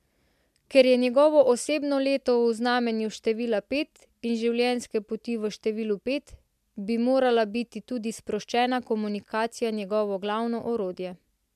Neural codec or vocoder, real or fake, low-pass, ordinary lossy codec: none; real; 14.4 kHz; MP3, 96 kbps